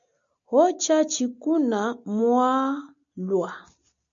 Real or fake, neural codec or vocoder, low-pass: real; none; 7.2 kHz